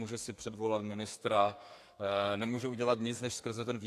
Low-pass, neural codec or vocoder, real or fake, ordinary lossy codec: 14.4 kHz; codec, 32 kHz, 1.9 kbps, SNAC; fake; AAC, 64 kbps